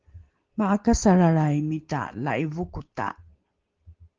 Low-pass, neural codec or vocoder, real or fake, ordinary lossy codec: 7.2 kHz; none; real; Opus, 16 kbps